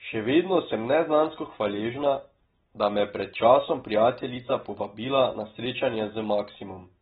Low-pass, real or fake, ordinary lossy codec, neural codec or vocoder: 19.8 kHz; real; AAC, 16 kbps; none